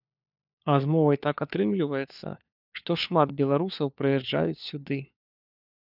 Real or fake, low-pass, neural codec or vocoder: fake; 5.4 kHz; codec, 16 kHz, 4 kbps, FunCodec, trained on LibriTTS, 50 frames a second